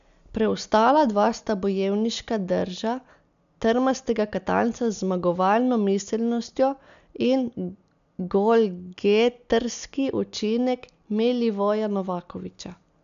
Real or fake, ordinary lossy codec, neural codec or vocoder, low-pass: real; none; none; 7.2 kHz